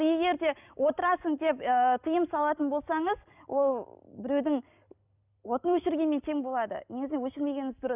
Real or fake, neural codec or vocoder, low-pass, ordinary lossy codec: real; none; 3.6 kHz; none